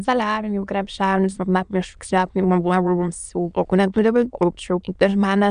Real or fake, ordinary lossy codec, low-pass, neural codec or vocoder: fake; MP3, 96 kbps; 9.9 kHz; autoencoder, 22.05 kHz, a latent of 192 numbers a frame, VITS, trained on many speakers